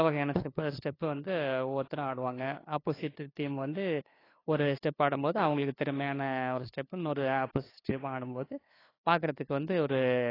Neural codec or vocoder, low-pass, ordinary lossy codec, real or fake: codec, 16 kHz, 2 kbps, FunCodec, trained on LibriTTS, 25 frames a second; 5.4 kHz; AAC, 24 kbps; fake